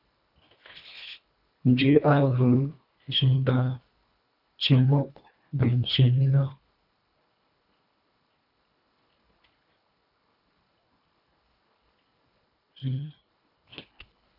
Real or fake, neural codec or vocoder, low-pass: fake; codec, 24 kHz, 1.5 kbps, HILCodec; 5.4 kHz